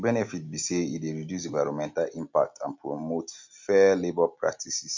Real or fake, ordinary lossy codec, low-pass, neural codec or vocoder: real; MP3, 64 kbps; 7.2 kHz; none